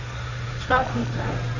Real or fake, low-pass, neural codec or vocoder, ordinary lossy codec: fake; 7.2 kHz; codec, 16 kHz, 1.1 kbps, Voila-Tokenizer; none